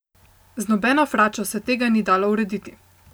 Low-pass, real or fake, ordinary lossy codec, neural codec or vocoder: none; real; none; none